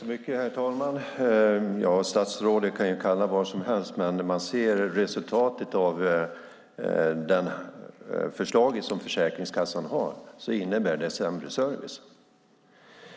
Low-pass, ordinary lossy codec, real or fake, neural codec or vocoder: none; none; real; none